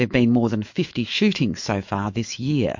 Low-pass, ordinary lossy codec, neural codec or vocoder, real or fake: 7.2 kHz; MP3, 48 kbps; autoencoder, 48 kHz, 128 numbers a frame, DAC-VAE, trained on Japanese speech; fake